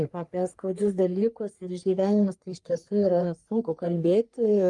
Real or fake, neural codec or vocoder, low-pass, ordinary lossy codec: fake; codec, 44.1 kHz, 3.4 kbps, Pupu-Codec; 10.8 kHz; Opus, 32 kbps